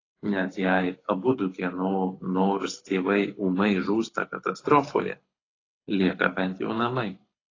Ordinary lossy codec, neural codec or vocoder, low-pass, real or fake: AAC, 32 kbps; codec, 24 kHz, 6 kbps, HILCodec; 7.2 kHz; fake